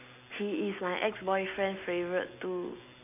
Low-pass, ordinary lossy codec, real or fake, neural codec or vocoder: 3.6 kHz; none; real; none